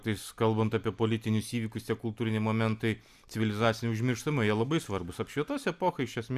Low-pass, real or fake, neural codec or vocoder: 14.4 kHz; real; none